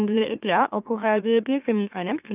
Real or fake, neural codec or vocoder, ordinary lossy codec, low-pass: fake; autoencoder, 44.1 kHz, a latent of 192 numbers a frame, MeloTTS; none; 3.6 kHz